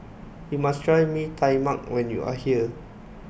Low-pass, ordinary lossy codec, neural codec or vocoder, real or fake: none; none; none; real